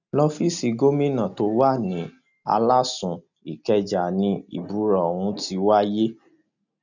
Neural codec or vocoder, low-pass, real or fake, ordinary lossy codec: none; 7.2 kHz; real; none